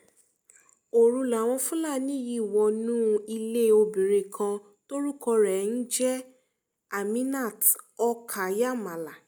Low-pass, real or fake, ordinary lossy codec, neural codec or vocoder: none; real; none; none